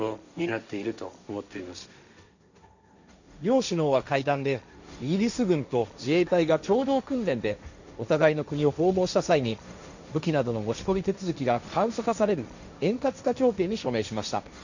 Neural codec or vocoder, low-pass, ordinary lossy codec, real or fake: codec, 16 kHz, 1.1 kbps, Voila-Tokenizer; 7.2 kHz; none; fake